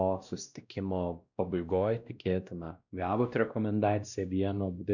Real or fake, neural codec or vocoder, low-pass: fake; codec, 16 kHz, 1 kbps, X-Codec, WavLM features, trained on Multilingual LibriSpeech; 7.2 kHz